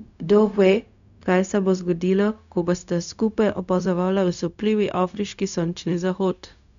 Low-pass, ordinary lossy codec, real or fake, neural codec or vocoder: 7.2 kHz; none; fake; codec, 16 kHz, 0.4 kbps, LongCat-Audio-Codec